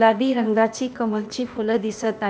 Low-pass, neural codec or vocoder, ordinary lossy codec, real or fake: none; codec, 16 kHz, 0.8 kbps, ZipCodec; none; fake